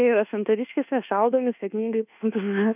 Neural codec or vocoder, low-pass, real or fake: codec, 24 kHz, 1.2 kbps, DualCodec; 3.6 kHz; fake